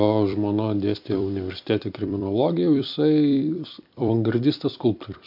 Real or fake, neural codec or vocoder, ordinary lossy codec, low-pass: fake; vocoder, 44.1 kHz, 128 mel bands every 256 samples, BigVGAN v2; MP3, 48 kbps; 5.4 kHz